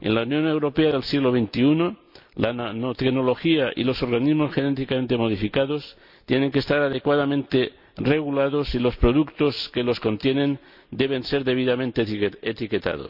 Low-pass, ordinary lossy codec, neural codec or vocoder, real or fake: 5.4 kHz; none; none; real